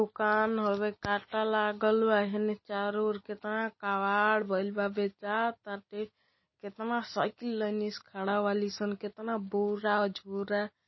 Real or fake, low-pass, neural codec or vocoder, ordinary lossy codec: real; 7.2 kHz; none; MP3, 24 kbps